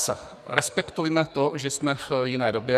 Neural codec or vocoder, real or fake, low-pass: codec, 44.1 kHz, 2.6 kbps, SNAC; fake; 14.4 kHz